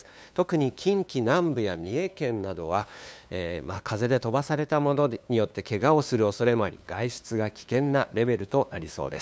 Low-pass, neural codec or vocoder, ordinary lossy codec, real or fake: none; codec, 16 kHz, 2 kbps, FunCodec, trained on LibriTTS, 25 frames a second; none; fake